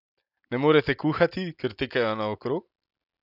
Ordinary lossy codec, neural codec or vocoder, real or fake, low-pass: none; none; real; 5.4 kHz